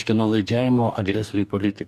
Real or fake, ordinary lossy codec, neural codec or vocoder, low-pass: fake; MP3, 96 kbps; codec, 44.1 kHz, 2.6 kbps, DAC; 14.4 kHz